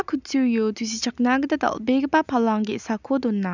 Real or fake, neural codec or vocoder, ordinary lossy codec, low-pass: real; none; none; 7.2 kHz